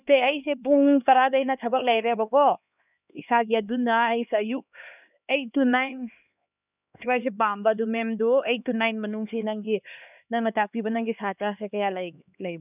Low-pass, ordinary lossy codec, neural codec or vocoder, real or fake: 3.6 kHz; none; codec, 16 kHz, 2 kbps, X-Codec, HuBERT features, trained on LibriSpeech; fake